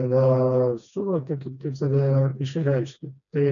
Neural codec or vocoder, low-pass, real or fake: codec, 16 kHz, 2 kbps, FreqCodec, smaller model; 7.2 kHz; fake